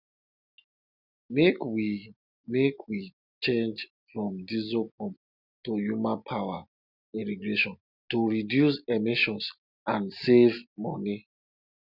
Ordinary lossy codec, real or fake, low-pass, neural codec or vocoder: none; real; 5.4 kHz; none